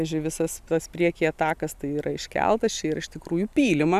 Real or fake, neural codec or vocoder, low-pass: real; none; 14.4 kHz